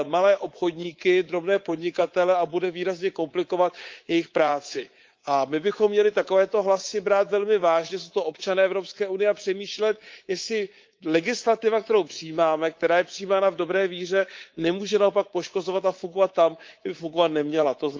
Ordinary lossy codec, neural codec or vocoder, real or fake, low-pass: Opus, 32 kbps; codec, 24 kHz, 3.1 kbps, DualCodec; fake; 7.2 kHz